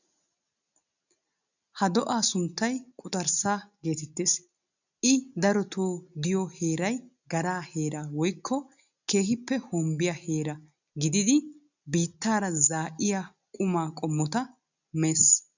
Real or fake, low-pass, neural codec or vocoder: real; 7.2 kHz; none